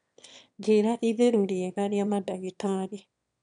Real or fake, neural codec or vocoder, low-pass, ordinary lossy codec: fake; autoencoder, 22.05 kHz, a latent of 192 numbers a frame, VITS, trained on one speaker; 9.9 kHz; none